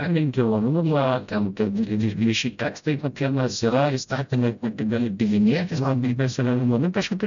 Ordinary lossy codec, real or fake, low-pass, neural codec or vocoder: MP3, 96 kbps; fake; 7.2 kHz; codec, 16 kHz, 0.5 kbps, FreqCodec, smaller model